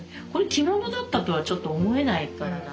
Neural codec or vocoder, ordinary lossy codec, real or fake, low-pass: none; none; real; none